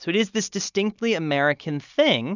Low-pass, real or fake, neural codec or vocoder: 7.2 kHz; real; none